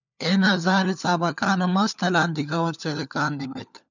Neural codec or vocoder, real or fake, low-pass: codec, 16 kHz, 4 kbps, FunCodec, trained on LibriTTS, 50 frames a second; fake; 7.2 kHz